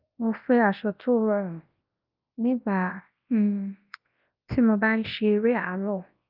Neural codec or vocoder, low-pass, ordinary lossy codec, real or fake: codec, 24 kHz, 0.9 kbps, WavTokenizer, large speech release; 5.4 kHz; Opus, 32 kbps; fake